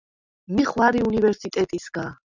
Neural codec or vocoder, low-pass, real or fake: none; 7.2 kHz; real